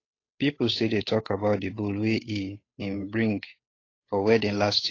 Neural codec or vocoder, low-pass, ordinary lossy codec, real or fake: codec, 16 kHz, 8 kbps, FunCodec, trained on Chinese and English, 25 frames a second; 7.2 kHz; AAC, 32 kbps; fake